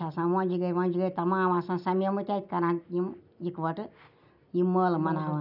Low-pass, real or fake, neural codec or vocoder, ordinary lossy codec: 5.4 kHz; real; none; none